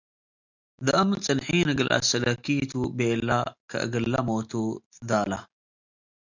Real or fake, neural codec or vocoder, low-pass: real; none; 7.2 kHz